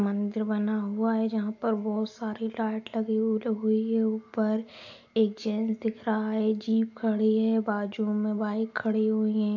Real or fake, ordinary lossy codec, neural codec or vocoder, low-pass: real; AAC, 48 kbps; none; 7.2 kHz